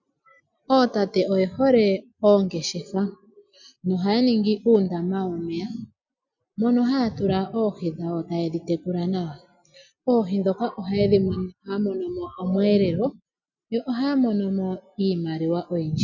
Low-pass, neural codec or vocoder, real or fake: 7.2 kHz; none; real